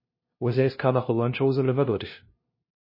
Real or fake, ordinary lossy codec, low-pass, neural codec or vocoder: fake; MP3, 24 kbps; 5.4 kHz; codec, 16 kHz, 0.5 kbps, FunCodec, trained on LibriTTS, 25 frames a second